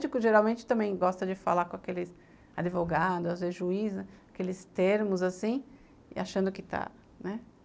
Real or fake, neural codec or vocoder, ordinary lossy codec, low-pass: real; none; none; none